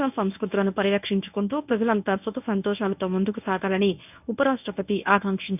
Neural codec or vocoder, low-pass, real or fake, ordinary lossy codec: codec, 24 kHz, 0.9 kbps, WavTokenizer, medium speech release version 1; 3.6 kHz; fake; none